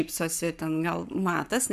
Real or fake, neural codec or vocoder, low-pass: fake; codec, 44.1 kHz, 7.8 kbps, Pupu-Codec; 14.4 kHz